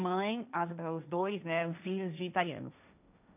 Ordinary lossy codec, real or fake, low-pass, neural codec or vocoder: none; fake; 3.6 kHz; codec, 16 kHz, 1.1 kbps, Voila-Tokenizer